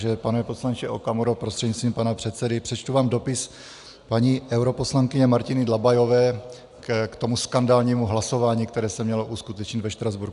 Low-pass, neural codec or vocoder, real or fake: 10.8 kHz; none; real